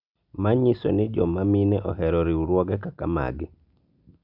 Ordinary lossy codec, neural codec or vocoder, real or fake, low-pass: none; none; real; 5.4 kHz